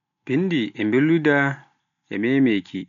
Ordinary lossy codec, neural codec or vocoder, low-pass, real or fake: none; none; 7.2 kHz; real